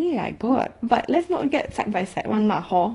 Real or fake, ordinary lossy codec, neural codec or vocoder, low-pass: fake; AAC, 32 kbps; codec, 24 kHz, 0.9 kbps, WavTokenizer, small release; 10.8 kHz